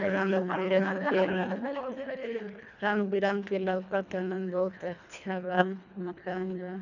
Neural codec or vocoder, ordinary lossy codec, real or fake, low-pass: codec, 24 kHz, 1.5 kbps, HILCodec; none; fake; 7.2 kHz